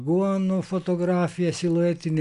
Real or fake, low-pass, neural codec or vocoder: real; 10.8 kHz; none